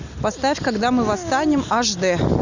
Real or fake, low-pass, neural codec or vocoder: real; 7.2 kHz; none